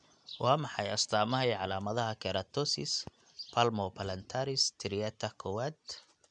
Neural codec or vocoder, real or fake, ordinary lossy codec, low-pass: none; real; none; 9.9 kHz